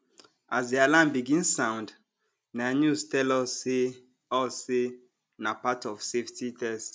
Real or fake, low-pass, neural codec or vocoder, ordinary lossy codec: real; none; none; none